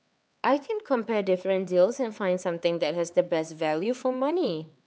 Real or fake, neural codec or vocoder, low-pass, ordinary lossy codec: fake; codec, 16 kHz, 4 kbps, X-Codec, HuBERT features, trained on LibriSpeech; none; none